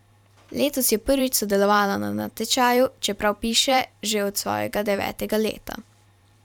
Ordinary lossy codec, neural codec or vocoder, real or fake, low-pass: none; vocoder, 44.1 kHz, 128 mel bands every 512 samples, BigVGAN v2; fake; 19.8 kHz